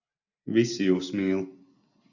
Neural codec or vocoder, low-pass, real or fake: none; 7.2 kHz; real